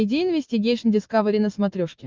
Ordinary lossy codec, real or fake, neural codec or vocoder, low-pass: Opus, 24 kbps; real; none; 7.2 kHz